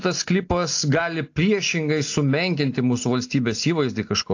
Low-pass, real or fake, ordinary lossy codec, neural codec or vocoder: 7.2 kHz; real; AAC, 48 kbps; none